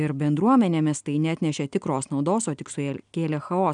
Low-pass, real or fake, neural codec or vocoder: 9.9 kHz; real; none